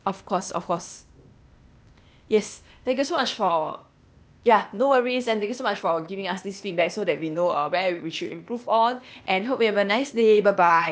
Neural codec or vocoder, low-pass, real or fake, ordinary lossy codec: codec, 16 kHz, 0.8 kbps, ZipCodec; none; fake; none